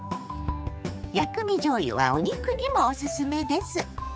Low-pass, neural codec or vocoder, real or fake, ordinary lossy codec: none; codec, 16 kHz, 4 kbps, X-Codec, HuBERT features, trained on general audio; fake; none